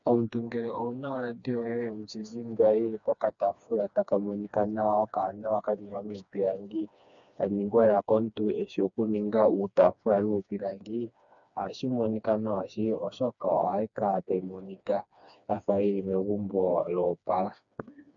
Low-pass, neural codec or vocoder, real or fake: 7.2 kHz; codec, 16 kHz, 2 kbps, FreqCodec, smaller model; fake